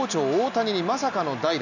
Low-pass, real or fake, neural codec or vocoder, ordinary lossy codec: 7.2 kHz; real; none; none